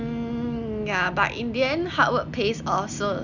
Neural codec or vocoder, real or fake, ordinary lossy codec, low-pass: none; real; none; 7.2 kHz